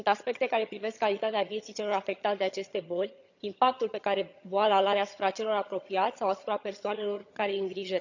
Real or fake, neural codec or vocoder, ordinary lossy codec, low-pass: fake; vocoder, 22.05 kHz, 80 mel bands, HiFi-GAN; none; 7.2 kHz